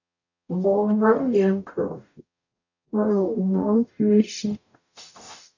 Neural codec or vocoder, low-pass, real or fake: codec, 44.1 kHz, 0.9 kbps, DAC; 7.2 kHz; fake